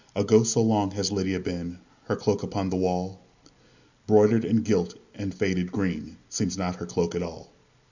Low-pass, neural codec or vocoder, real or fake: 7.2 kHz; none; real